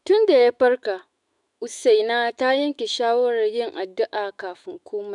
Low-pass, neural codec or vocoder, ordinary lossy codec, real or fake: 10.8 kHz; autoencoder, 48 kHz, 128 numbers a frame, DAC-VAE, trained on Japanese speech; none; fake